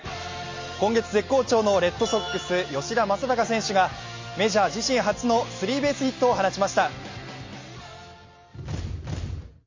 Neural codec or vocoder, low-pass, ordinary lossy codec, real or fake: none; 7.2 kHz; MP3, 32 kbps; real